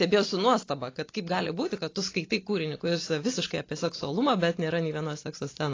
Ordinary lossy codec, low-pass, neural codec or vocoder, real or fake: AAC, 32 kbps; 7.2 kHz; none; real